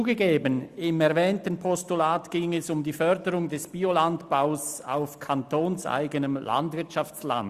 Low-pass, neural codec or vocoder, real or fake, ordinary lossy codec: 14.4 kHz; none; real; Opus, 64 kbps